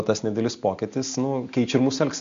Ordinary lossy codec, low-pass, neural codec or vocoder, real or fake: MP3, 48 kbps; 7.2 kHz; none; real